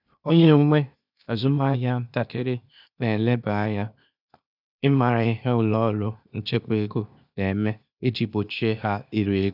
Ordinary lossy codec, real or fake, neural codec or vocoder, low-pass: none; fake; codec, 16 kHz, 0.8 kbps, ZipCodec; 5.4 kHz